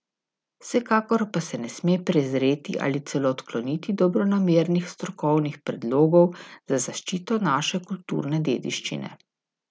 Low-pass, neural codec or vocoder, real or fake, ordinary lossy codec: none; none; real; none